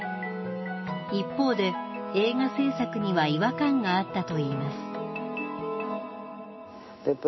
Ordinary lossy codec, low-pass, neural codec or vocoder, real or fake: MP3, 24 kbps; 7.2 kHz; none; real